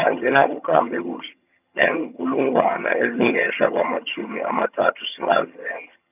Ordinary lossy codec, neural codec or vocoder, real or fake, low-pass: none; vocoder, 22.05 kHz, 80 mel bands, HiFi-GAN; fake; 3.6 kHz